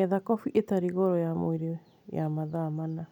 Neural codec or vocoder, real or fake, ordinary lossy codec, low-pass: none; real; none; 19.8 kHz